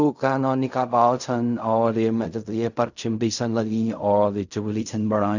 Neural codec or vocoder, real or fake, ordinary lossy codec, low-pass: codec, 16 kHz in and 24 kHz out, 0.4 kbps, LongCat-Audio-Codec, fine tuned four codebook decoder; fake; none; 7.2 kHz